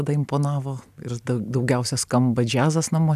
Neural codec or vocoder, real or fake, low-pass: none; real; 14.4 kHz